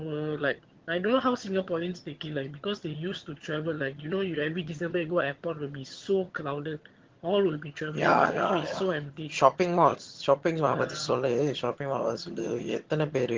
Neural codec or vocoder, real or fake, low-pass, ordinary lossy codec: vocoder, 22.05 kHz, 80 mel bands, HiFi-GAN; fake; 7.2 kHz; Opus, 16 kbps